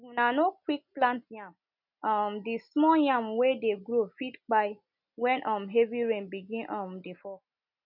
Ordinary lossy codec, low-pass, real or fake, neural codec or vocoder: none; 5.4 kHz; real; none